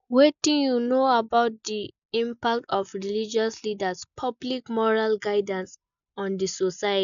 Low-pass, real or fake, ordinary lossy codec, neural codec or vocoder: 7.2 kHz; real; none; none